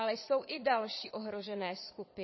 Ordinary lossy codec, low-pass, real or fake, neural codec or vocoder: MP3, 24 kbps; 7.2 kHz; fake; vocoder, 22.05 kHz, 80 mel bands, Vocos